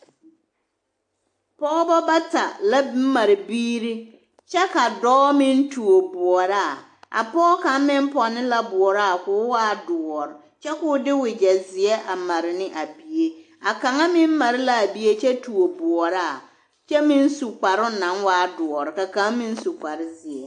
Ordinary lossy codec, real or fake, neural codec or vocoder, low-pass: AAC, 64 kbps; real; none; 10.8 kHz